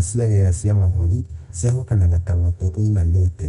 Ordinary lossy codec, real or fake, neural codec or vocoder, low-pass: Opus, 64 kbps; fake; codec, 24 kHz, 0.9 kbps, WavTokenizer, medium music audio release; 10.8 kHz